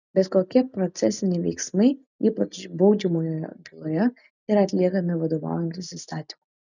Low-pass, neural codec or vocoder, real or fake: 7.2 kHz; none; real